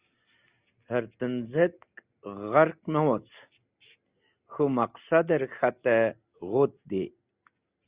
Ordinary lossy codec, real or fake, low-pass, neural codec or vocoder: Opus, 64 kbps; real; 3.6 kHz; none